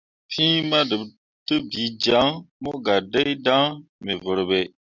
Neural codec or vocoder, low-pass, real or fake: none; 7.2 kHz; real